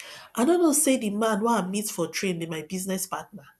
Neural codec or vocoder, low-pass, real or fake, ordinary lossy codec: none; none; real; none